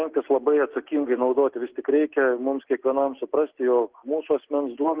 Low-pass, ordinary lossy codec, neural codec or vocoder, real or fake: 3.6 kHz; Opus, 16 kbps; none; real